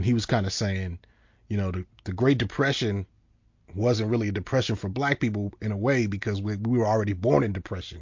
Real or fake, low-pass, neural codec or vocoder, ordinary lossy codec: real; 7.2 kHz; none; MP3, 48 kbps